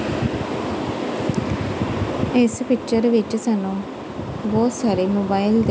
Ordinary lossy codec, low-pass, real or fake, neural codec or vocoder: none; none; real; none